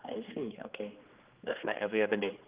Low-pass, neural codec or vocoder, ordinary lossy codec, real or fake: 3.6 kHz; codec, 16 kHz, 2 kbps, X-Codec, HuBERT features, trained on general audio; Opus, 24 kbps; fake